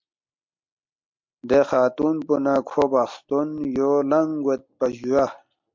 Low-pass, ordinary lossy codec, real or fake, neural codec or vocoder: 7.2 kHz; MP3, 48 kbps; real; none